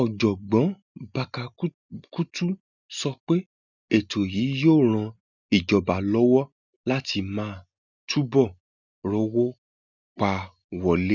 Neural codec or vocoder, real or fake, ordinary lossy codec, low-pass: none; real; none; 7.2 kHz